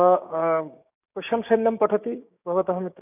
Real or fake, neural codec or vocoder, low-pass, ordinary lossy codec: fake; codec, 16 kHz, 6 kbps, DAC; 3.6 kHz; none